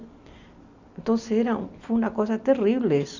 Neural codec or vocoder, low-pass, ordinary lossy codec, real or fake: none; 7.2 kHz; none; real